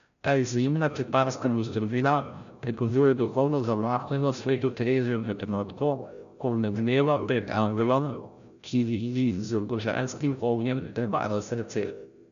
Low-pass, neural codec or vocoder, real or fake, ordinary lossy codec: 7.2 kHz; codec, 16 kHz, 0.5 kbps, FreqCodec, larger model; fake; MP3, 96 kbps